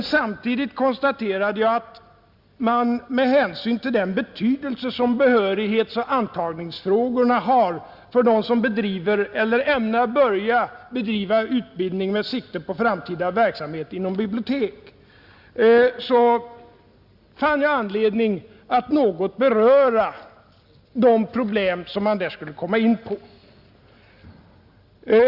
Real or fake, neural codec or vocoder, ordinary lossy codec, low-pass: real; none; Opus, 64 kbps; 5.4 kHz